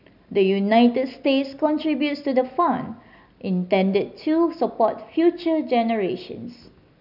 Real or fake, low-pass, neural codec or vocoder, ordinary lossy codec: real; 5.4 kHz; none; none